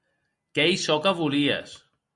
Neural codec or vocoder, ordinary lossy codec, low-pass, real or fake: none; Opus, 64 kbps; 10.8 kHz; real